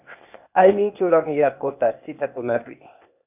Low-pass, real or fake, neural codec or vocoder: 3.6 kHz; fake; codec, 16 kHz, 0.8 kbps, ZipCodec